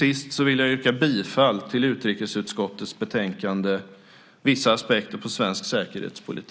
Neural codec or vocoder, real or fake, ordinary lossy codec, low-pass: none; real; none; none